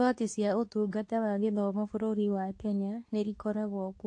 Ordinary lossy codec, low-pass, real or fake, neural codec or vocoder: AAC, 48 kbps; 10.8 kHz; fake; codec, 24 kHz, 0.9 kbps, WavTokenizer, medium speech release version 2